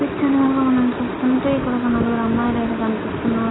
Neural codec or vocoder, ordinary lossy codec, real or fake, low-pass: none; AAC, 16 kbps; real; 7.2 kHz